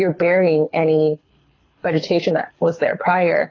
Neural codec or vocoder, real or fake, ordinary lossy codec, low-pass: codec, 24 kHz, 6 kbps, HILCodec; fake; AAC, 32 kbps; 7.2 kHz